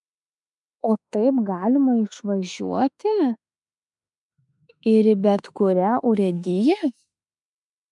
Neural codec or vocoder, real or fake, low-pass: autoencoder, 48 kHz, 32 numbers a frame, DAC-VAE, trained on Japanese speech; fake; 10.8 kHz